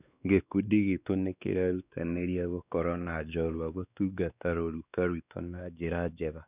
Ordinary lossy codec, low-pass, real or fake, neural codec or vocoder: none; 3.6 kHz; fake; codec, 16 kHz, 2 kbps, X-Codec, WavLM features, trained on Multilingual LibriSpeech